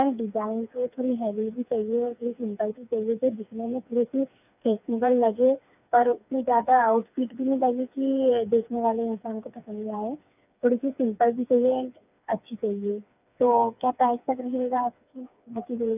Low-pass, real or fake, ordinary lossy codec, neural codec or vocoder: 3.6 kHz; fake; none; codec, 16 kHz, 4 kbps, FreqCodec, smaller model